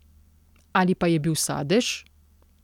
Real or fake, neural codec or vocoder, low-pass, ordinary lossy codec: real; none; 19.8 kHz; none